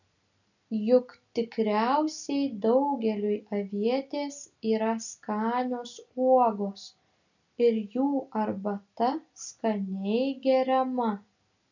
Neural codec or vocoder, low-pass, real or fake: none; 7.2 kHz; real